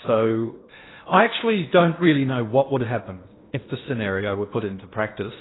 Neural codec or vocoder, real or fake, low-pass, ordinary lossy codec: codec, 16 kHz in and 24 kHz out, 0.8 kbps, FocalCodec, streaming, 65536 codes; fake; 7.2 kHz; AAC, 16 kbps